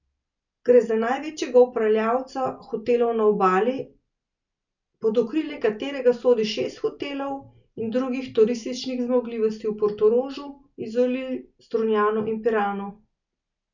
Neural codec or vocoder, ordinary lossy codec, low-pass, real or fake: none; none; 7.2 kHz; real